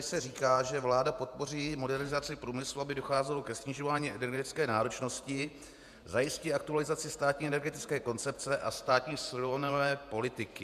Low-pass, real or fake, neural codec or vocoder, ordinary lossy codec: 14.4 kHz; fake; vocoder, 48 kHz, 128 mel bands, Vocos; MP3, 96 kbps